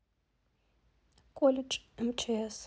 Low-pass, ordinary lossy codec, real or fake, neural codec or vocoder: none; none; real; none